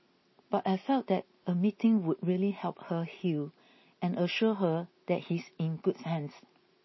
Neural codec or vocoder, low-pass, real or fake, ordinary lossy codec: none; 7.2 kHz; real; MP3, 24 kbps